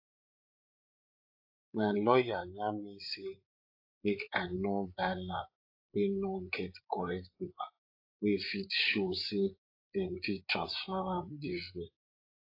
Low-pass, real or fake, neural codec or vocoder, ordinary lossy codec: 5.4 kHz; fake; codec, 16 kHz, 8 kbps, FreqCodec, larger model; AAC, 32 kbps